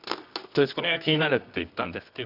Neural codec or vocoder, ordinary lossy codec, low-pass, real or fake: codec, 24 kHz, 0.9 kbps, WavTokenizer, medium music audio release; none; 5.4 kHz; fake